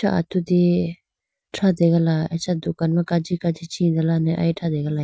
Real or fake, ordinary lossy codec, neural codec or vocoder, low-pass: real; none; none; none